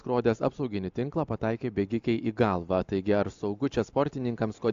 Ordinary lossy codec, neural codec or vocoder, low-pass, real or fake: AAC, 64 kbps; none; 7.2 kHz; real